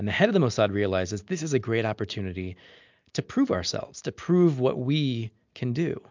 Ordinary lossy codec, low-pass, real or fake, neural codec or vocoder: MP3, 64 kbps; 7.2 kHz; real; none